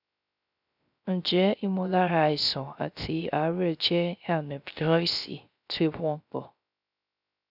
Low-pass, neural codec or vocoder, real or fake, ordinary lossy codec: 5.4 kHz; codec, 16 kHz, 0.3 kbps, FocalCodec; fake; none